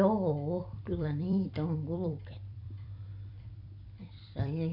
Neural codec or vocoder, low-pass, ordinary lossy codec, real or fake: vocoder, 44.1 kHz, 128 mel bands every 256 samples, BigVGAN v2; 5.4 kHz; none; fake